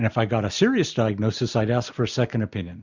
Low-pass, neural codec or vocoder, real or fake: 7.2 kHz; none; real